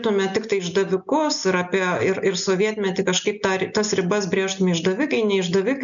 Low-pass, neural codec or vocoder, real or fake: 7.2 kHz; none; real